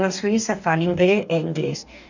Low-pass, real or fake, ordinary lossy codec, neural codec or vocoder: 7.2 kHz; fake; none; codec, 44.1 kHz, 2.6 kbps, DAC